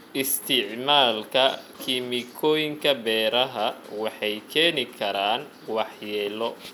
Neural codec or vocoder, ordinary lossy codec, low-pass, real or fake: none; none; 19.8 kHz; real